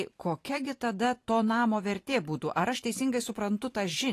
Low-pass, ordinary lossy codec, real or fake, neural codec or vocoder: 14.4 kHz; AAC, 48 kbps; fake; vocoder, 44.1 kHz, 128 mel bands every 512 samples, BigVGAN v2